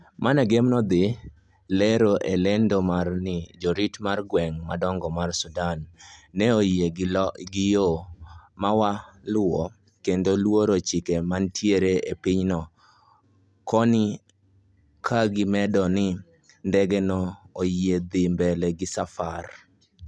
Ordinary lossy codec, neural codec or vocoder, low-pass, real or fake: none; none; none; real